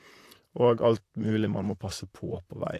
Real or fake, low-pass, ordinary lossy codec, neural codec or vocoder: fake; 14.4 kHz; AAC, 64 kbps; vocoder, 44.1 kHz, 128 mel bands, Pupu-Vocoder